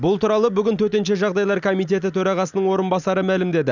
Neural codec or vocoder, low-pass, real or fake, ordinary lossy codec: none; 7.2 kHz; real; none